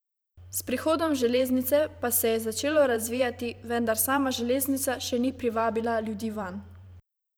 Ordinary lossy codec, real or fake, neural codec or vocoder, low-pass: none; fake; vocoder, 44.1 kHz, 128 mel bands every 512 samples, BigVGAN v2; none